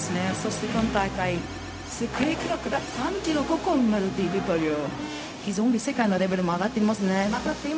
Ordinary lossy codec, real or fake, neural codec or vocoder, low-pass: none; fake; codec, 16 kHz, 0.4 kbps, LongCat-Audio-Codec; none